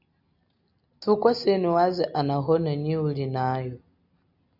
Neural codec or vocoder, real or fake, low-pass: none; real; 5.4 kHz